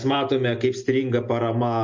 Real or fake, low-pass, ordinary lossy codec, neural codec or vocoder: real; 7.2 kHz; MP3, 48 kbps; none